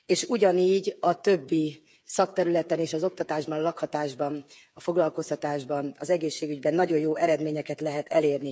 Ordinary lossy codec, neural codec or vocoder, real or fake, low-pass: none; codec, 16 kHz, 8 kbps, FreqCodec, smaller model; fake; none